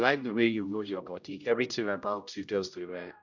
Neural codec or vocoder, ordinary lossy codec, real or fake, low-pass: codec, 16 kHz, 0.5 kbps, X-Codec, HuBERT features, trained on general audio; none; fake; 7.2 kHz